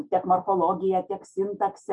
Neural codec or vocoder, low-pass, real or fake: none; 10.8 kHz; real